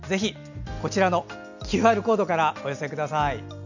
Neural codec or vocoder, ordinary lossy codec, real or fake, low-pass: none; none; real; 7.2 kHz